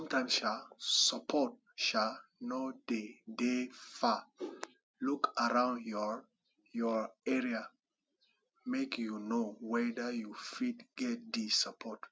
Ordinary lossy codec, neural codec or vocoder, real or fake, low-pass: none; none; real; none